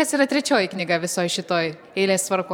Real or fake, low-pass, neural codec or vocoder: fake; 19.8 kHz; vocoder, 44.1 kHz, 128 mel bands every 512 samples, BigVGAN v2